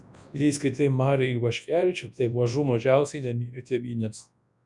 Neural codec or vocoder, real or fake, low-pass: codec, 24 kHz, 0.9 kbps, WavTokenizer, large speech release; fake; 10.8 kHz